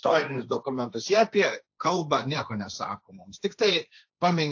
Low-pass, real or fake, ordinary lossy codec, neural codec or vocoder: 7.2 kHz; fake; AAC, 48 kbps; codec, 16 kHz, 1.1 kbps, Voila-Tokenizer